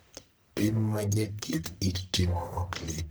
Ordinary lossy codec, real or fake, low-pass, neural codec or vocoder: none; fake; none; codec, 44.1 kHz, 1.7 kbps, Pupu-Codec